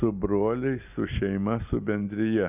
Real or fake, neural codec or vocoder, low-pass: real; none; 3.6 kHz